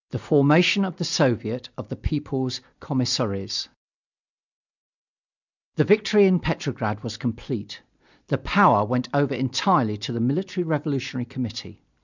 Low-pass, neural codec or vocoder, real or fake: 7.2 kHz; none; real